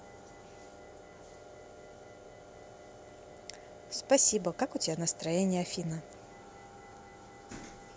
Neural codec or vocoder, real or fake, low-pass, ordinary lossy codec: none; real; none; none